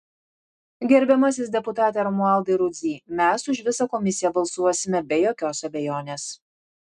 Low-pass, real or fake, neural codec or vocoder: 10.8 kHz; real; none